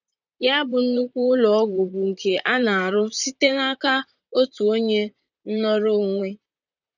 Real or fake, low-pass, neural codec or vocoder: fake; 7.2 kHz; vocoder, 44.1 kHz, 128 mel bands, Pupu-Vocoder